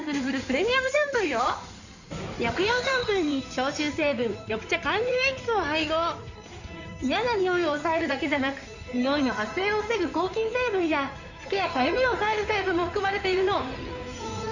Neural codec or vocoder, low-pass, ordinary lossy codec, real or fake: codec, 16 kHz in and 24 kHz out, 2.2 kbps, FireRedTTS-2 codec; 7.2 kHz; none; fake